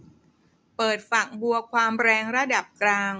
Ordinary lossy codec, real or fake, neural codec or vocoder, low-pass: none; real; none; none